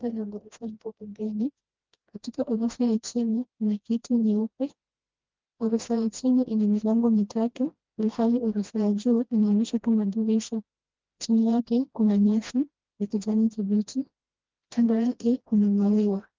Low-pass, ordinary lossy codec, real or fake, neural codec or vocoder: 7.2 kHz; Opus, 24 kbps; fake; codec, 16 kHz, 1 kbps, FreqCodec, smaller model